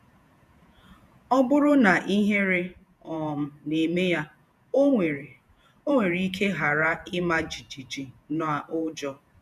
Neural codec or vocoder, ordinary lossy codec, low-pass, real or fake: vocoder, 48 kHz, 128 mel bands, Vocos; none; 14.4 kHz; fake